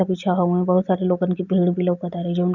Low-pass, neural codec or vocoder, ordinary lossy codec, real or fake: 7.2 kHz; none; none; real